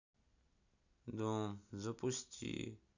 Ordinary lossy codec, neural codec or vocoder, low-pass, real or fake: none; none; 7.2 kHz; real